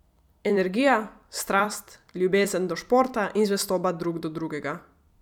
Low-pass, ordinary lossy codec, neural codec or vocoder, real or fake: 19.8 kHz; none; vocoder, 44.1 kHz, 128 mel bands every 256 samples, BigVGAN v2; fake